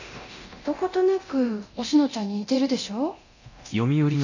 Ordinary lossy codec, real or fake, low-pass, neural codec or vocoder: none; fake; 7.2 kHz; codec, 24 kHz, 0.9 kbps, DualCodec